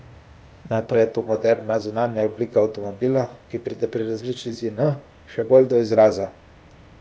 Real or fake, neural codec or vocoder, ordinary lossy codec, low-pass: fake; codec, 16 kHz, 0.8 kbps, ZipCodec; none; none